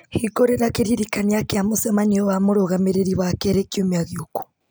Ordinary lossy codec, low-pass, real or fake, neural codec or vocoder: none; none; real; none